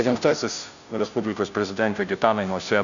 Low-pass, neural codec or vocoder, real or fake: 7.2 kHz; codec, 16 kHz, 0.5 kbps, FunCodec, trained on Chinese and English, 25 frames a second; fake